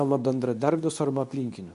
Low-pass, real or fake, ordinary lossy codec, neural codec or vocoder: 10.8 kHz; fake; MP3, 64 kbps; codec, 24 kHz, 0.9 kbps, WavTokenizer, medium speech release version 2